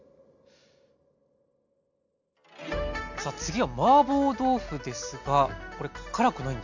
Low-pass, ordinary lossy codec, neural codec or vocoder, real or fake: 7.2 kHz; none; none; real